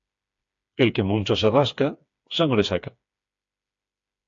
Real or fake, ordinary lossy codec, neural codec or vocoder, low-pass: fake; AAC, 64 kbps; codec, 16 kHz, 4 kbps, FreqCodec, smaller model; 7.2 kHz